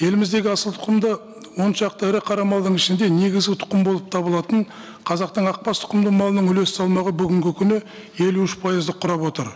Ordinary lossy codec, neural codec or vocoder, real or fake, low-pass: none; none; real; none